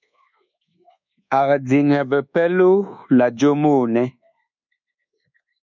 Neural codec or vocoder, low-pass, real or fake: codec, 24 kHz, 1.2 kbps, DualCodec; 7.2 kHz; fake